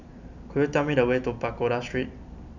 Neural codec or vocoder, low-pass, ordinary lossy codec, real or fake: none; 7.2 kHz; none; real